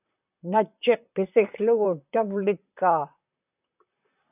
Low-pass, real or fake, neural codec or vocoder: 3.6 kHz; fake; vocoder, 44.1 kHz, 128 mel bands, Pupu-Vocoder